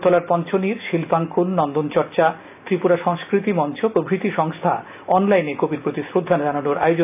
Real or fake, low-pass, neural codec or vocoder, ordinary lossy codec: real; 3.6 kHz; none; AAC, 32 kbps